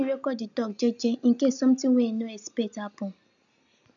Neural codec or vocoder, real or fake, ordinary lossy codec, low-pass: none; real; none; 7.2 kHz